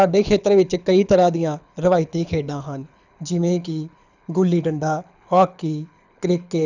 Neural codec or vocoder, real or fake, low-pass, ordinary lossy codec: codec, 24 kHz, 6 kbps, HILCodec; fake; 7.2 kHz; none